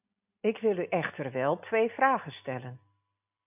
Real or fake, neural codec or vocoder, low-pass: real; none; 3.6 kHz